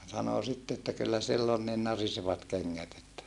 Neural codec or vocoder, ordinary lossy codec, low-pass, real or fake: none; none; 10.8 kHz; real